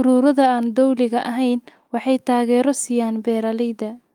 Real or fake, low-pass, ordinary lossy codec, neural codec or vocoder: fake; 19.8 kHz; Opus, 32 kbps; autoencoder, 48 kHz, 128 numbers a frame, DAC-VAE, trained on Japanese speech